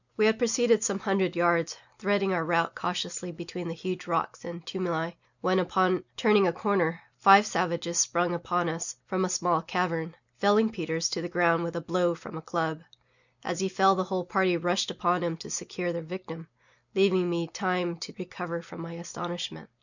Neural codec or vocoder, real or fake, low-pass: none; real; 7.2 kHz